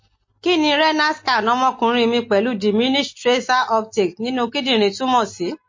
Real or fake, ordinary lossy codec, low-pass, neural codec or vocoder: real; MP3, 32 kbps; 7.2 kHz; none